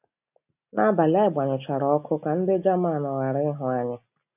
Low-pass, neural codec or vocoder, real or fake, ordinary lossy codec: 3.6 kHz; none; real; none